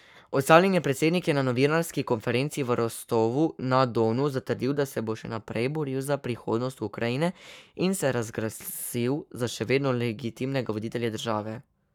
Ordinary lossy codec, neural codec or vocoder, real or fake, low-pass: none; codec, 44.1 kHz, 7.8 kbps, Pupu-Codec; fake; 19.8 kHz